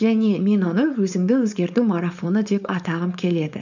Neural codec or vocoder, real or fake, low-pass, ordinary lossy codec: codec, 16 kHz, 4.8 kbps, FACodec; fake; 7.2 kHz; none